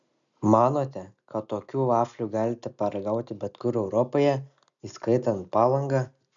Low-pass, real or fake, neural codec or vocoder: 7.2 kHz; real; none